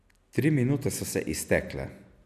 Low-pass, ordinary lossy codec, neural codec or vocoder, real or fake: 14.4 kHz; none; none; real